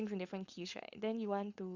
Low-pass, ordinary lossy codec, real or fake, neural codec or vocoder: 7.2 kHz; Opus, 64 kbps; fake; codec, 16 kHz, 4.8 kbps, FACodec